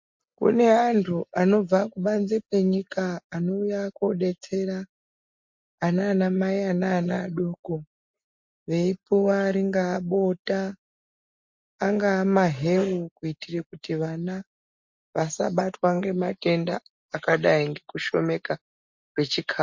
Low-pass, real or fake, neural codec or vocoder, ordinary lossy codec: 7.2 kHz; real; none; MP3, 48 kbps